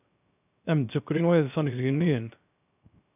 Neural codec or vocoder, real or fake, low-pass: codec, 16 kHz, 0.3 kbps, FocalCodec; fake; 3.6 kHz